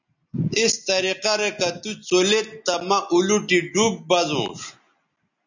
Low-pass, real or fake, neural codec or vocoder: 7.2 kHz; real; none